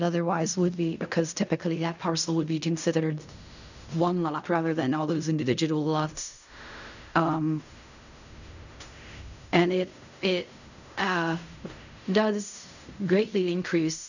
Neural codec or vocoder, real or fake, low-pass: codec, 16 kHz in and 24 kHz out, 0.4 kbps, LongCat-Audio-Codec, fine tuned four codebook decoder; fake; 7.2 kHz